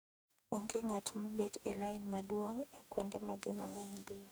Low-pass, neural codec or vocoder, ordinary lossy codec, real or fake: none; codec, 44.1 kHz, 2.6 kbps, DAC; none; fake